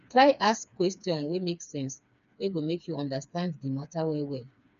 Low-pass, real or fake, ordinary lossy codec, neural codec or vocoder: 7.2 kHz; fake; none; codec, 16 kHz, 4 kbps, FreqCodec, smaller model